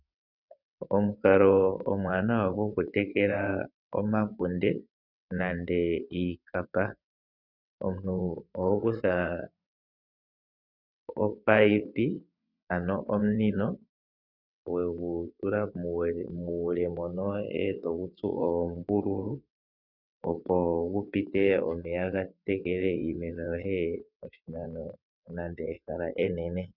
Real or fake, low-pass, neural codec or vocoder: fake; 5.4 kHz; vocoder, 22.05 kHz, 80 mel bands, WaveNeXt